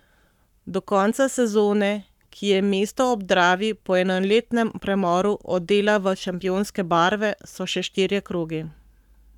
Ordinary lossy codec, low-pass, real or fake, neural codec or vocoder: none; 19.8 kHz; fake; codec, 44.1 kHz, 7.8 kbps, Pupu-Codec